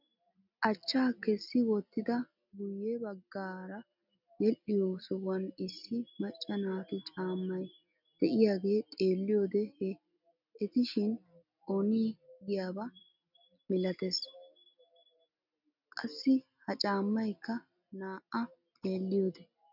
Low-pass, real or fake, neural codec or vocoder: 5.4 kHz; real; none